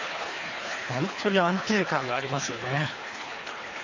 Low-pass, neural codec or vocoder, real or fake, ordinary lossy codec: 7.2 kHz; codec, 24 kHz, 3 kbps, HILCodec; fake; MP3, 32 kbps